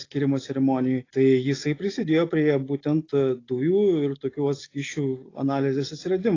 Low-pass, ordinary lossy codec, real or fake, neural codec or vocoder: 7.2 kHz; AAC, 32 kbps; real; none